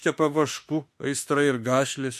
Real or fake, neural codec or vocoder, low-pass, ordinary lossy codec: fake; autoencoder, 48 kHz, 32 numbers a frame, DAC-VAE, trained on Japanese speech; 14.4 kHz; MP3, 64 kbps